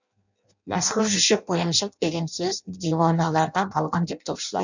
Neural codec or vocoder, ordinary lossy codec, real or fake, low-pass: codec, 16 kHz in and 24 kHz out, 0.6 kbps, FireRedTTS-2 codec; none; fake; 7.2 kHz